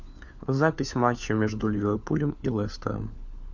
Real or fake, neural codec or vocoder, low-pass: fake; codec, 16 kHz, 4 kbps, FunCodec, trained on LibriTTS, 50 frames a second; 7.2 kHz